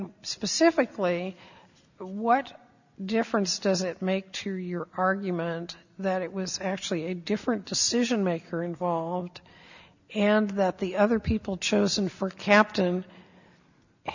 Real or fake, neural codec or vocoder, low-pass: real; none; 7.2 kHz